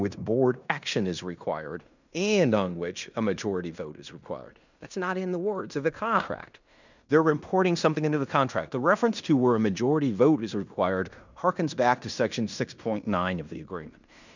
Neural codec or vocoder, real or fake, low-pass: codec, 16 kHz in and 24 kHz out, 0.9 kbps, LongCat-Audio-Codec, fine tuned four codebook decoder; fake; 7.2 kHz